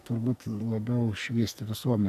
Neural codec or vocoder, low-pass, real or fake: codec, 44.1 kHz, 2.6 kbps, SNAC; 14.4 kHz; fake